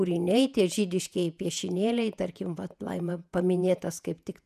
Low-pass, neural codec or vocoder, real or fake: 14.4 kHz; vocoder, 48 kHz, 128 mel bands, Vocos; fake